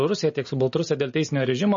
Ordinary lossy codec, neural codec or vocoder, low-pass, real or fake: MP3, 32 kbps; none; 7.2 kHz; real